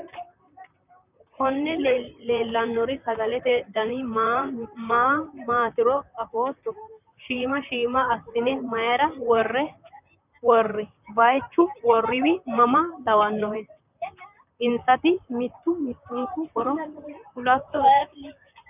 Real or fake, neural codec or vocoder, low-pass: fake; vocoder, 44.1 kHz, 128 mel bands, Pupu-Vocoder; 3.6 kHz